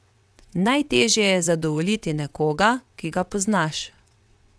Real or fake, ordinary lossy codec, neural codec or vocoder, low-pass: fake; none; vocoder, 22.05 kHz, 80 mel bands, WaveNeXt; none